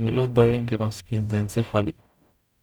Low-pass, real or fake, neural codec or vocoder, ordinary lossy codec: none; fake; codec, 44.1 kHz, 0.9 kbps, DAC; none